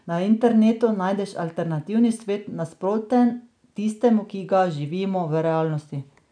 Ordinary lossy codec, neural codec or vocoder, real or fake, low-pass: none; none; real; 9.9 kHz